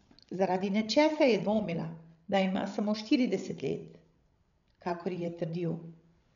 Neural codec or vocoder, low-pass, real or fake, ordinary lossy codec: codec, 16 kHz, 16 kbps, FreqCodec, larger model; 7.2 kHz; fake; none